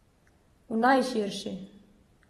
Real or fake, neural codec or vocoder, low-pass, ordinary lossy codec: fake; vocoder, 44.1 kHz, 128 mel bands every 512 samples, BigVGAN v2; 19.8 kHz; AAC, 32 kbps